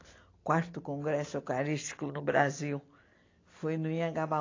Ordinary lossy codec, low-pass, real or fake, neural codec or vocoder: AAC, 32 kbps; 7.2 kHz; real; none